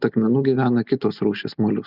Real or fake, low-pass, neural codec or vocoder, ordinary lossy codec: real; 5.4 kHz; none; Opus, 32 kbps